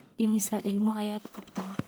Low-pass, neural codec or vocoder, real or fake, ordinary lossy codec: none; codec, 44.1 kHz, 1.7 kbps, Pupu-Codec; fake; none